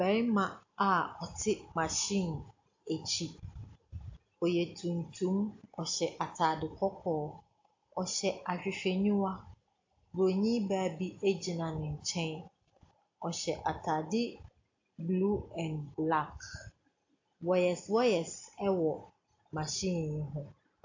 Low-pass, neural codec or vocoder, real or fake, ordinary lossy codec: 7.2 kHz; none; real; AAC, 48 kbps